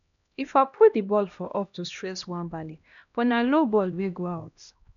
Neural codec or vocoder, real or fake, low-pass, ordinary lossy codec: codec, 16 kHz, 1 kbps, X-Codec, HuBERT features, trained on LibriSpeech; fake; 7.2 kHz; none